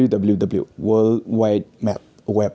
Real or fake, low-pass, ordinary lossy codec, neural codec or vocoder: real; none; none; none